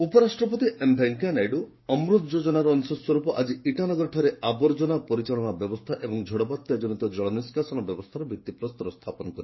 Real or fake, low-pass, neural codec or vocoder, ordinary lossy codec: fake; 7.2 kHz; codec, 16 kHz, 6 kbps, DAC; MP3, 24 kbps